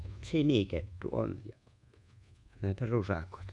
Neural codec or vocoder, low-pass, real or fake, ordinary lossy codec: codec, 24 kHz, 1.2 kbps, DualCodec; 10.8 kHz; fake; none